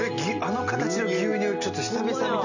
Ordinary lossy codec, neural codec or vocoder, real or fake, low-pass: none; none; real; 7.2 kHz